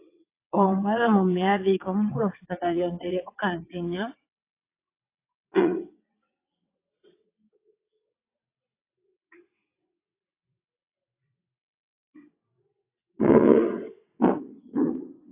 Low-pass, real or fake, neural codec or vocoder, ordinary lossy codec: 3.6 kHz; fake; vocoder, 22.05 kHz, 80 mel bands, WaveNeXt; AAC, 24 kbps